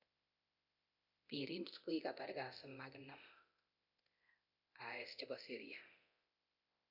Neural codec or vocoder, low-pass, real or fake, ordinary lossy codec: codec, 24 kHz, 0.9 kbps, DualCodec; 5.4 kHz; fake; none